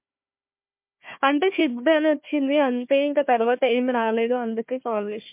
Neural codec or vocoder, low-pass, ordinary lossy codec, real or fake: codec, 16 kHz, 1 kbps, FunCodec, trained on Chinese and English, 50 frames a second; 3.6 kHz; MP3, 32 kbps; fake